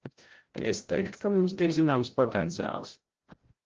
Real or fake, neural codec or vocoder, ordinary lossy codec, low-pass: fake; codec, 16 kHz, 0.5 kbps, FreqCodec, larger model; Opus, 16 kbps; 7.2 kHz